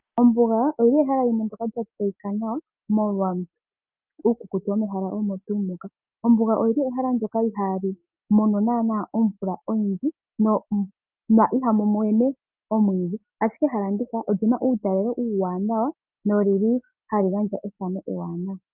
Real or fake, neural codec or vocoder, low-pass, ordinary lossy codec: real; none; 3.6 kHz; Opus, 24 kbps